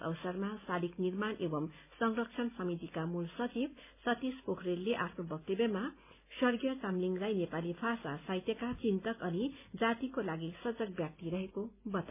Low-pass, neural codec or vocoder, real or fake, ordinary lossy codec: 3.6 kHz; none; real; MP3, 32 kbps